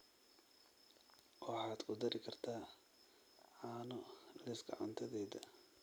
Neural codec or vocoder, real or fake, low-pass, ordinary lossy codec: none; real; none; none